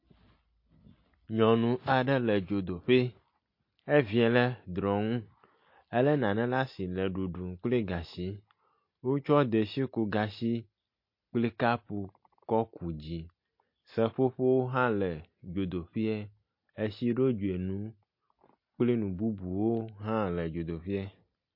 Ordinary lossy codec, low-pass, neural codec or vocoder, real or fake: MP3, 32 kbps; 5.4 kHz; none; real